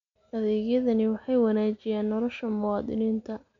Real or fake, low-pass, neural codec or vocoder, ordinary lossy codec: real; 7.2 kHz; none; MP3, 64 kbps